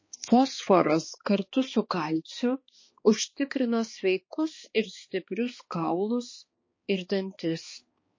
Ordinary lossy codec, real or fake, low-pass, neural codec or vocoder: MP3, 32 kbps; fake; 7.2 kHz; codec, 16 kHz, 2 kbps, X-Codec, HuBERT features, trained on balanced general audio